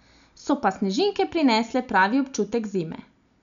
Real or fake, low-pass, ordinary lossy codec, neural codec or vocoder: real; 7.2 kHz; none; none